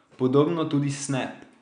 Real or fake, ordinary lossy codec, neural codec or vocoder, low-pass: real; none; none; 9.9 kHz